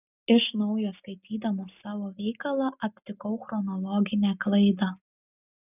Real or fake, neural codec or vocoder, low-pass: real; none; 3.6 kHz